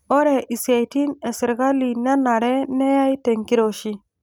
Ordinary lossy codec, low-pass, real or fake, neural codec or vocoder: none; none; real; none